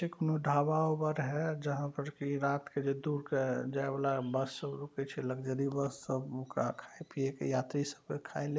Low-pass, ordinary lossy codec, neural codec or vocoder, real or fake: none; none; none; real